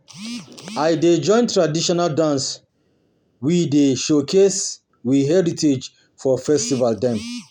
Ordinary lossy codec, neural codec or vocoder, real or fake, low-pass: none; none; real; 19.8 kHz